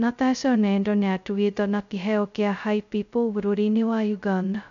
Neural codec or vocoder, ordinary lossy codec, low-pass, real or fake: codec, 16 kHz, 0.2 kbps, FocalCodec; none; 7.2 kHz; fake